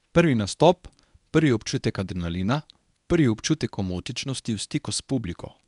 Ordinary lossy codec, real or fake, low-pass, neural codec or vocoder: none; fake; 10.8 kHz; codec, 24 kHz, 0.9 kbps, WavTokenizer, medium speech release version 1